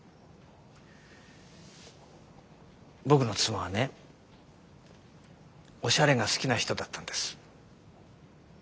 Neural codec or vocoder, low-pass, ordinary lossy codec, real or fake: none; none; none; real